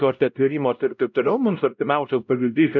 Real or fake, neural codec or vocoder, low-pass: fake; codec, 16 kHz, 0.5 kbps, X-Codec, WavLM features, trained on Multilingual LibriSpeech; 7.2 kHz